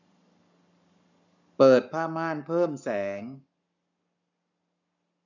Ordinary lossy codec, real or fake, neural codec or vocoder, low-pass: none; fake; codec, 44.1 kHz, 7.8 kbps, Pupu-Codec; 7.2 kHz